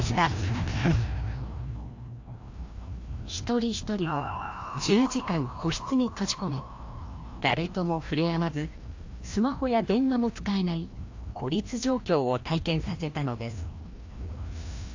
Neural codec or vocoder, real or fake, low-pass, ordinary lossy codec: codec, 16 kHz, 1 kbps, FreqCodec, larger model; fake; 7.2 kHz; AAC, 48 kbps